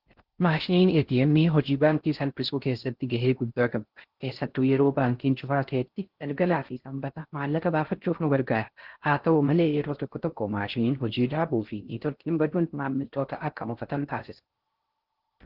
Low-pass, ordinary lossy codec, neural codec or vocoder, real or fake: 5.4 kHz; Opus, 16 kbps; codec, 16 kHz in and 24 kHz out, 0.6 kbps, FocalCodec, streaming, 4096 codes; fake